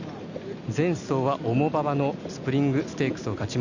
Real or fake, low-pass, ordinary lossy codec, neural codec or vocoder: real; 7.2 kHz; none; none